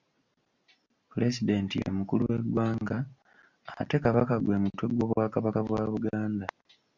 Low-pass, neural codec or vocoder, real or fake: 7.2 kHz; none; real